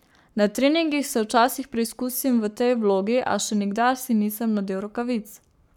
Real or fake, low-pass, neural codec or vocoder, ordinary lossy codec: fake; 19.8 kHz; codec, 44.1 kHz, 7.8 kbps, Pupu-Codec; none